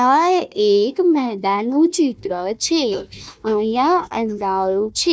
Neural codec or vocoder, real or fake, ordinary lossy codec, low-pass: codec, 16 kHz, 1 kbps, FunCodec, trained on Chinese and English, 50 frames a second; fake; none; none